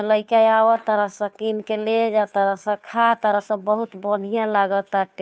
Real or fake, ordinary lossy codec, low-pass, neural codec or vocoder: fake; none; none; codec, 16 kHz, 2 kbps, FunCodec, trained on Chinese and English, 25 frames a second